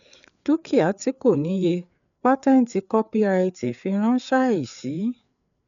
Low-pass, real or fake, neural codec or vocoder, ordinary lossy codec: 7.2 kHz; fake; codec, 16 kHz, 4 kbps, FreqCodec, larger model; none